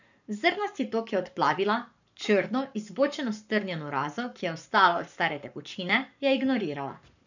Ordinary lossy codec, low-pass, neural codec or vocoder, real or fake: none; 7.2 kHz; codec, 44.1 kHz, 7.8 kbps, Pupu-Codec; fake